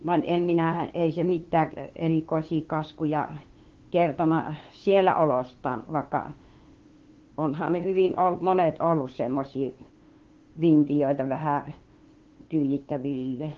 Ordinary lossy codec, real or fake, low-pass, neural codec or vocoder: Opus, 16 kbps; fake; 7.2 kHz; codec, 16 kHz, 2 kbps, FunCodec, trained on LibriTTS, 25 frames a second